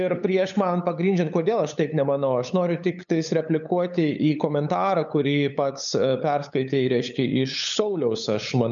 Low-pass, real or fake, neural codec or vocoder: 7.2 kHz; fake; codec, 16 kHz, 8 kbps, FunCodec, trained on LibriTTS, 25 frames a second